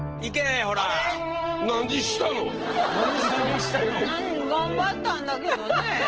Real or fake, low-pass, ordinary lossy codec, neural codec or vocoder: real; 7.2 kHz; Opus, 16 kbps; none